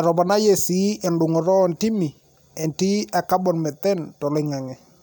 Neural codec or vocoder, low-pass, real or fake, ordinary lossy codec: none; none; real; none